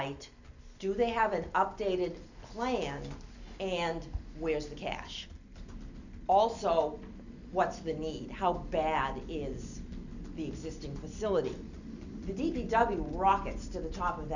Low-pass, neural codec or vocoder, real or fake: 7.2 kHz; none; real